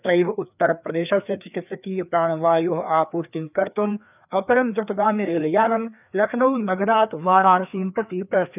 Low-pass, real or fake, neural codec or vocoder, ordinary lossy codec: 3.6 kHz; fake; codec, 16 kHz, 2 kbps, FreqCodec, larger model; none